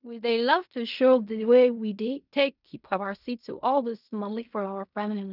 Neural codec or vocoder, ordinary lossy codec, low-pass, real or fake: codec, 16 kHz in and 24 kHz out, 0.4 kbps, LongCat-Audio-Codec, fine tuned four codebook decoder; none; 5.4 kHz; fake